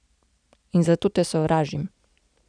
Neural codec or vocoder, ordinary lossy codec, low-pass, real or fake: none; none; 9.9 kHz; real